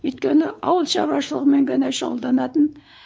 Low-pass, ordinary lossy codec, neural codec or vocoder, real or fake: none; none; codec, 16 kHz, 4 kbps, X-Codec, WavLM features, trained on Multilingual LibriSpeech; fake